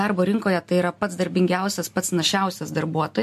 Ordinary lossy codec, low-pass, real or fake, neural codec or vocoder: MP3, 64 kbps; 14.4 kHz; real; none